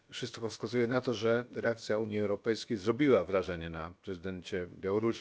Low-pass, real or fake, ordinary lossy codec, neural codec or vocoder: none; fake; none; codec, 16 kHz, about 1 kbps, DyCAST, with the encoder's durations